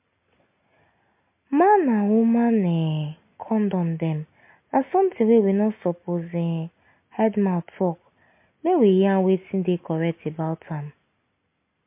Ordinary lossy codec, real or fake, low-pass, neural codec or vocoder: MP3, 24 kbps; real; 3.6 kHz; none